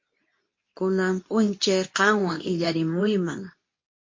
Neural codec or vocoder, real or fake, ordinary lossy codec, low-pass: codec, 24 kHz, 0.9 kbps, WavTokenizer, medium speech release version 2; fake; MP3, 32 kbps; 7.2 kHz